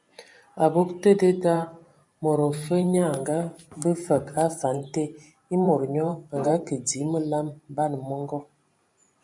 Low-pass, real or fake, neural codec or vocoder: 10.8 kHz; fake; vocoder, 44.1 kHz, 128 mel bands every 512 samples, BigVGAN v2